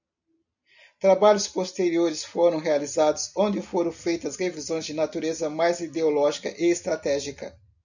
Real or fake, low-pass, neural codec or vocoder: real; 7.2 kHz; none